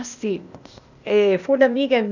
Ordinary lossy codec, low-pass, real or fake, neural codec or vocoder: none; 7.2 kHz; fake; codec, 16 kHz, 0.8 kbps, ZipCodec